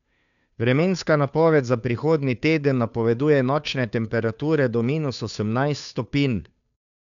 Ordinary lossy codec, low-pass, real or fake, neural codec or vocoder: none; 7.2 kHz; fake; codec, 16 kHz, 2 kbps, FunCodec, trained on Chinese and English, 25 frames a second